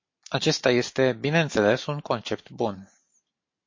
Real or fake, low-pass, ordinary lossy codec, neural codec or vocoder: real; 7.2 kHz; MP3, 32 kbps; none